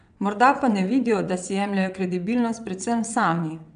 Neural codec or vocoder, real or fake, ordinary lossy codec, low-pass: vocoder, 22.05 kHz, 80 mel bands, WaveNeXt; fake; none; 9.9 kHz